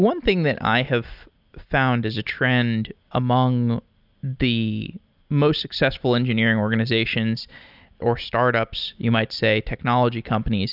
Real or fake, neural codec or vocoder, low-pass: real; none; 5.4 kHz